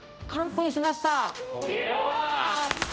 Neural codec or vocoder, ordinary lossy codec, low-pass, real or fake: codec, 16 kHz, 0.5 kbps, X-Codec, HuBERT features, trained on general audio; none; none; fake